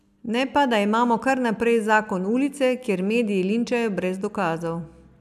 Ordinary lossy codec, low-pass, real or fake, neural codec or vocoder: none; 14.4 kHz; real; none